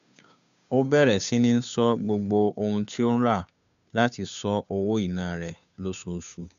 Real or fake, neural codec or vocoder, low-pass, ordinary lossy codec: fake; codec, 16 kHz, 2 kbps, FunCodec, trained on Chinese and English, 25 frames a second; 7.2 kHz; none